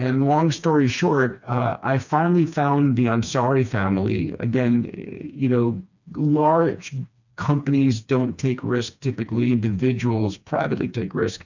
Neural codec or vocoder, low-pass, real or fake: codec, 16 kHz, 2 kbps, FreqCodec, smaller model; 7.2 kHz; fake